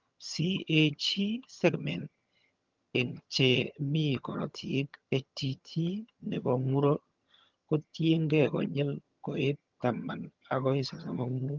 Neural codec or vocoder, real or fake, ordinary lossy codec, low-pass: vocoder, 22.05 kHz, 80 mel bands, HiFi-GAN; fake; Opus, 24 kbps; 7.2 kHz